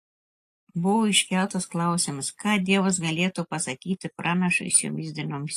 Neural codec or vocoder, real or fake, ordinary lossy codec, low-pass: none; real; AAC, 64 kbps; 14.4 kHz